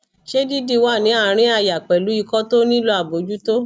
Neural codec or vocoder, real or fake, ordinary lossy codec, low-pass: none; real; none; none